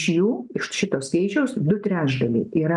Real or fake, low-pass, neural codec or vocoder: real; 10.8 kHz; none